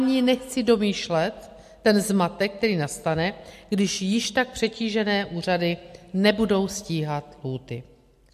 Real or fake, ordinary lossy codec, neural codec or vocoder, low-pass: real; MP3, 64 kbps; none; 14.4 kHz